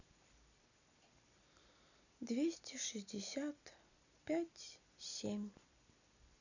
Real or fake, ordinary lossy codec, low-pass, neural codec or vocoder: real; none; 7.2 kHz; none